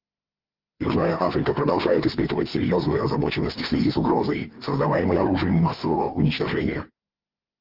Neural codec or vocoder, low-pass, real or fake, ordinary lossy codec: codec, 16 kHz, 4 kbps, FreqCodec, larger model; 5.4 kHz; fake; Opus, 32 kbps